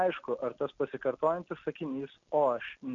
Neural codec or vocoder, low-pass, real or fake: none; 7.2 kHz; real